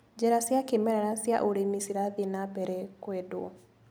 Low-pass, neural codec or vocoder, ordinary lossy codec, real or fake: none; none; none; real